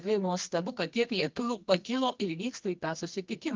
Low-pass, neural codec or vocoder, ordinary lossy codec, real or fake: 7.2 kHz; codec, 24 kHz, 0.9 kbps, WavTokenizer, medium music audio release; Opus, 32 kbps; fake